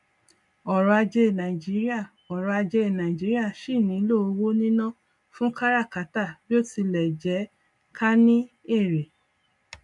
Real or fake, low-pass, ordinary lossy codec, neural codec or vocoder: real; 10.8 kHz; none; none